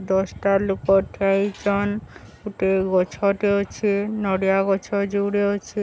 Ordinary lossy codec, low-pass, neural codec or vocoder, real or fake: none; none; none; real